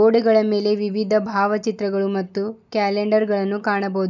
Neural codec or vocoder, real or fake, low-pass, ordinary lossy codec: none; real; 7.2 kHz; none